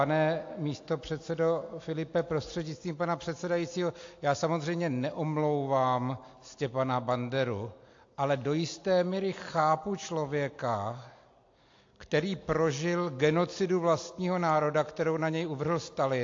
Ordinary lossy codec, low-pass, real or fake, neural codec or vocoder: AAC, 48 kbps; 7.2 kHz; real; none